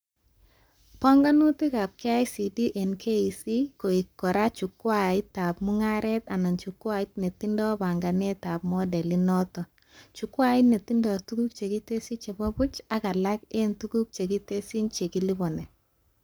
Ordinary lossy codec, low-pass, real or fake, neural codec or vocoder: none; none; fake; codec, 44.1 kHz, 7.8 kbps, Pupu-Codec